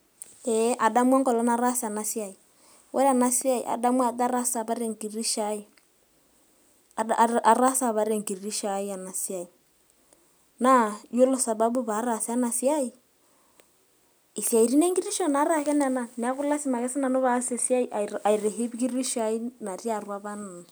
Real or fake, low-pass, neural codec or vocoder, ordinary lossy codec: real; none; none; none